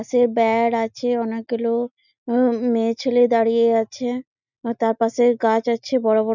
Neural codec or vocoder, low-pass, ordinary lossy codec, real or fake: none; 7.2 kHz; none; real